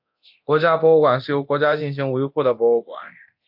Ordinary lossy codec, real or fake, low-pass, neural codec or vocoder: AAC, 48 kbps; fake; 5.4 kHz; codec, 24 kHz, 0.9 kbps, DualCodec